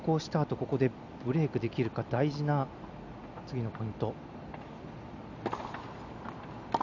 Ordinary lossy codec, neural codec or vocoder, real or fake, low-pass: none; none; real; 7.2 kHz